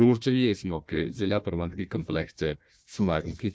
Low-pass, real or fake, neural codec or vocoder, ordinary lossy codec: none; fake; codec, 16 kHz, 1 kbps, FunCodec, trained on Chinese and English, 50 frames a second; none